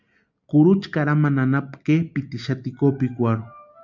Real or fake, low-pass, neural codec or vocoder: real; 7.2 kHz; none